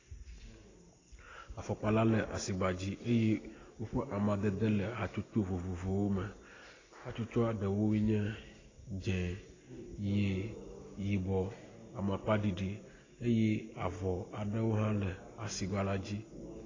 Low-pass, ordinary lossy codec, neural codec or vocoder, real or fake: 7.2 kHz; AAC, 32 kbps; none; real